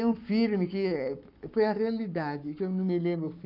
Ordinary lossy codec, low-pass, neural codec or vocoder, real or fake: none; 5.4 kHz; codec, 44.1 kHz, 7.8 kbps, Pupu-Codec; fake